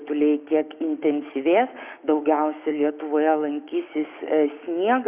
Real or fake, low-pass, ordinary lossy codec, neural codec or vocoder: fake; 3.6 kHz; Opus, 64 kbps; codec, 16 kHz, 6 kbps, DAC